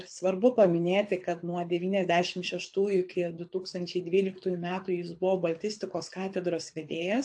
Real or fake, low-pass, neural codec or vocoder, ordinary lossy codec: fake; 9.9 kHz; codec, 24 kHz, 6 kbps, HILCodec; AAC, 64 kbps